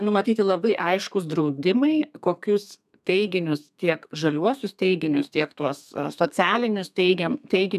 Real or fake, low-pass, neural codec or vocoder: fake; 14.4 kHz; codec, 32 kHz, 1.9 kbps, SNAC